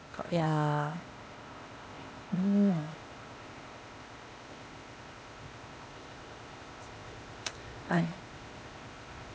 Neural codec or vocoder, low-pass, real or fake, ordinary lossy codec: codec, 16 kHz, 0.8 kbps, ZipCodec; none; fake; none